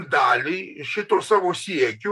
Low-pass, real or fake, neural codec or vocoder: 14.4 kHz; fake; vocoder, 44.1 kHz, 128 mel bands, Pupu-Vocoder